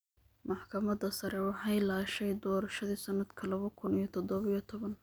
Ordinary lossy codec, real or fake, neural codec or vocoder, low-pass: none; real; none; none